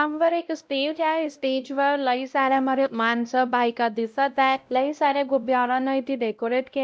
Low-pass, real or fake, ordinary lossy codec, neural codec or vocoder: none; fake; none; codec, 16 kHz, 0.5 kbps, X-Codec, WavLM features, trained on Multilingual LibriSpeech